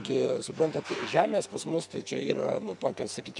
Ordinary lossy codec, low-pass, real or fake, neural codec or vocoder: MP3, 96 kbps; 10.8 kHz; fake; codec, 44.1 kHz, 2.6 kbps, SNAC